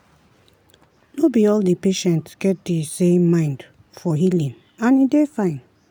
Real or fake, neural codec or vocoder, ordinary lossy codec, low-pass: real; none; none; none